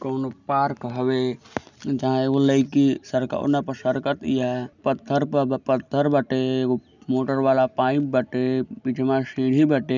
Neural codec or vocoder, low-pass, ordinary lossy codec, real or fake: none; 7.2 kHz; none; real